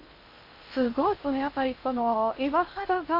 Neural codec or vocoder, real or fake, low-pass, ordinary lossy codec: codec, 16 kHz in and 24 kHz out, 0.6 kbps, FocalCodec, streaming, 2048 codes; fake; 5.4 kHz; MP3, 32 kbps